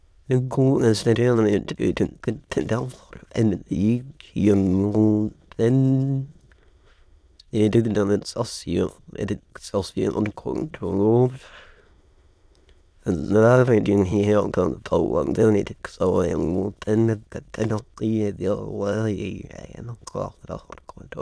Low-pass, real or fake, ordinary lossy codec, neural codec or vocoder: none; fake; none; autoencoder, 22.05 kHz, a latent of 192 numbers a frame, VITS, trained on many speakers